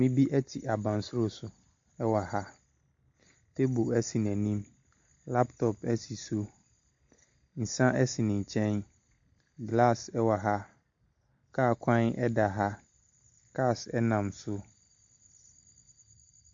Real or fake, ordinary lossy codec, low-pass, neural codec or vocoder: real; MP3, 48 kbps; 7.2 kHz; none